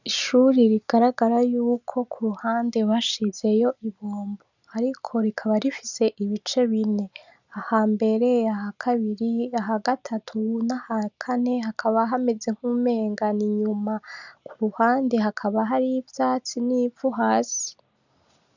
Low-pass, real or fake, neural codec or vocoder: 7.2 kHz; real; none